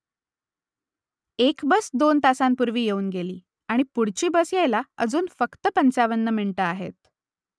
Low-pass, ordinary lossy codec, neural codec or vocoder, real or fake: none; none; none; real